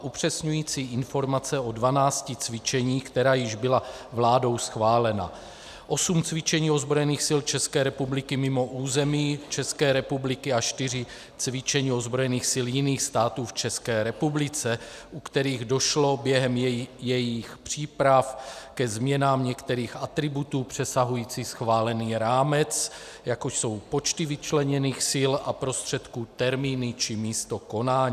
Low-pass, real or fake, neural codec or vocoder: 14.4 kHz; real; none